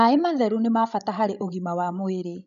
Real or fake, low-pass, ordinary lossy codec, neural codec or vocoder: fake; 7.2 kHz; none; codec, 16 kHz, 16 kbps, FreqCodec, larger model